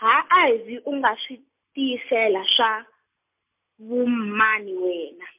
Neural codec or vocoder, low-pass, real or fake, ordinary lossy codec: none; 3.6 kHz; real; MP3, 32 kbps